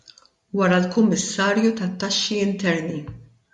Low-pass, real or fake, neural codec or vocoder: 10.8 kHz; real; none